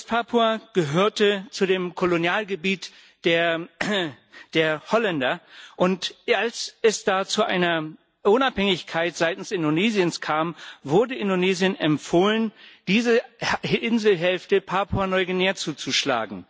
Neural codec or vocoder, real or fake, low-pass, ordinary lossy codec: none; real; none; none